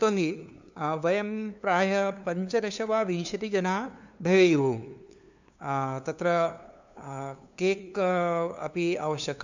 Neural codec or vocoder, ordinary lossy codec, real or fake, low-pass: codec, 16 kHz, 2 kbps, FunCodec, trained on LibriTTS, 25 frames a second; none; fake; 7.2 kHz